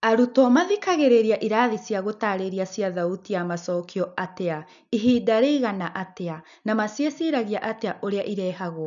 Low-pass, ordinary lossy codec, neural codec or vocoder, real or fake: 7.2 kHz; none; none; real